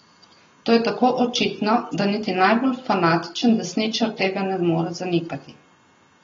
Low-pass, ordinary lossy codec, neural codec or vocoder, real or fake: 19.8 kHz; AAC, 24 kbps; none; real